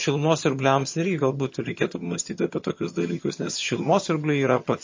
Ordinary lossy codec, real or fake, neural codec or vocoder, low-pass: MP3, 32 kbps; fake; vocoder, 22.05 kHz, 80 mel bands, HiFi-GAN; 7.2 kHz